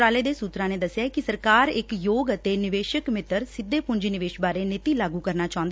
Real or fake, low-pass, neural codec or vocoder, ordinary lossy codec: real; none; none; none